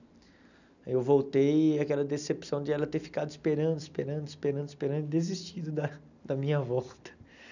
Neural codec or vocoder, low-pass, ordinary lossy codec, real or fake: none; 7.2 kHz; none; real